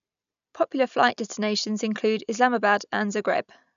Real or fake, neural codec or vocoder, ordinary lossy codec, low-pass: real; none; none; 7.2 kHz